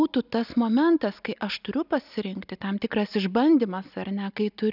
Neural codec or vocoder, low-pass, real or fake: none; 5.4 kHz; real